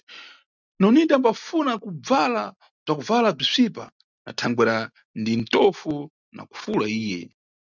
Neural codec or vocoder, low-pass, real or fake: none; 7.2 kHz; real